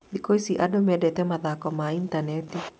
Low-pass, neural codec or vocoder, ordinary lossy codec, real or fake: none; none; none; real